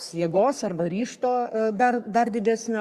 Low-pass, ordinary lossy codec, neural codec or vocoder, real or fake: 14.4 kHz; Opus, 64 kbps; codec, 44.1 kHz, 3.4 kbps, Pupu-Codec; fake